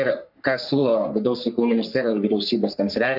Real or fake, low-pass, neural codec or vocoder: fake; 5.4 kHz; codec, 44.1 kHz, 3.4 kbps, Pupu-Codec